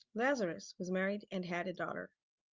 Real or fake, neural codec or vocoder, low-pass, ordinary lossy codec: real; none; 7.2 kHz; Opus, 32 kbps